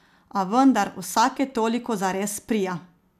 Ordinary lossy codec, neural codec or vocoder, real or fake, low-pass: none; none; real; 14.4 kHz